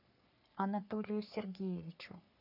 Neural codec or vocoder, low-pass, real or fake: codec, 44.1 kHz, 3.4 kbps, Pupu-Codec; 5.4 kHz; fake